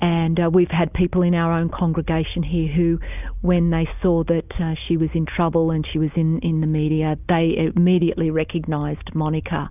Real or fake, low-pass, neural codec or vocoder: real; 3.6 kHz; none